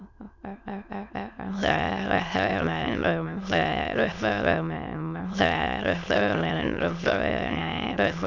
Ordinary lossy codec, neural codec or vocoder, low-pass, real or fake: none; autoencoder, 22.05 kHz, a latent of 192 numbers a frame, VITS, trained on many speakers; 7.2 kHz; fake